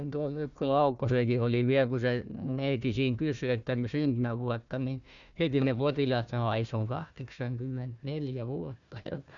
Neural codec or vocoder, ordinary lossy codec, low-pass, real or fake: codec, 16 kHz, 1 kbps, FunCodec, trained on Chinese and English, 50 frames a second; none; 7.2 kHz; fake